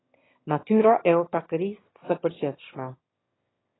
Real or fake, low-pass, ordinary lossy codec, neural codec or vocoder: fake; 7.2 kHz; AAC, 16 kbps; autoencoder, 22.05 kHz, a latent of 192 numbers a frame, VITS, trained on one speaker